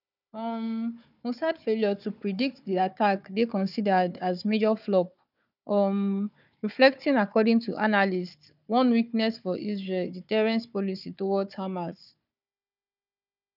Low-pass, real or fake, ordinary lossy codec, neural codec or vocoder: 5.4 kHz; fake; none; codec, 16 kHz, 4 kbps, FunCodec, trained on Chinese and English, 50 frames a second